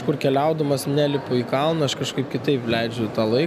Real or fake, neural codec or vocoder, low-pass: real; none; 14.4 kHz